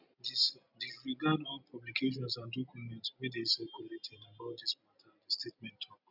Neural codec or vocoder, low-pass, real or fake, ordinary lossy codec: none; 5.4 kHz; real; none